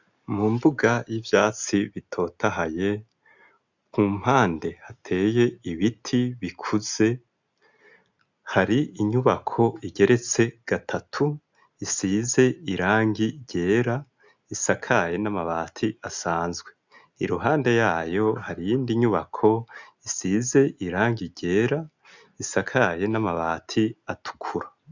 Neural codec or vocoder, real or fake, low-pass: none; real; 7.2 kHz